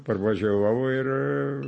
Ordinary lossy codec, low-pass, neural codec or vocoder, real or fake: MP3, 32 kbps; 10.8 kHz; none; real